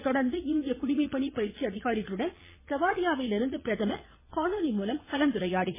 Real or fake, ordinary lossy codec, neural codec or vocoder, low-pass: fake; MP3, 16 kbps; vocoder, 22.05 kHz, 80 mel bands, Vocos; 3.6 kHz